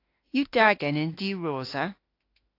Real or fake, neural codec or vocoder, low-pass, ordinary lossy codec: fake; autoencoder, 48 kHz, 32 numbers a frame, DAC-VAE, trained on Japanese speech; 5.4 kHz; AAC, 32 kbps